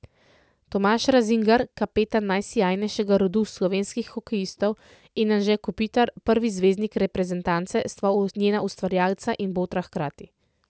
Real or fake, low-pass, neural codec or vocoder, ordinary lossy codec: real; none; none; none